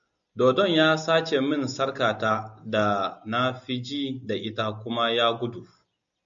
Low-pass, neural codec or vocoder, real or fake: 7.2 kHz; none; real